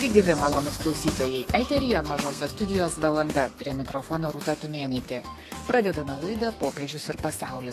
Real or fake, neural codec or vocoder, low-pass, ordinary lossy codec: fake; codec, 32 kHz, 1.9 kbps, SNAC; 14.4 kHz; AAC, 64 kbps